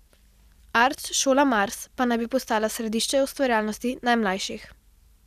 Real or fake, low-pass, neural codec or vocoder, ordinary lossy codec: real; 14.4 kHz; none; none